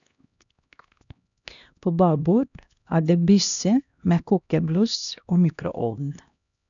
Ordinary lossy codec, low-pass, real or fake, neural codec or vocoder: none; 7.2 kHz; fake; codec, 16 kHz, 1 kbps, X-Codec, HuBERT features, trained on LibriSpeech